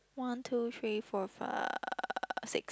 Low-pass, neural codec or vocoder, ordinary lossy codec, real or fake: none; none; none; real